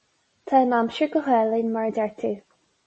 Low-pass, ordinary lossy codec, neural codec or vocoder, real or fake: 10.8 kHz; MP3, 32 kbps; none; real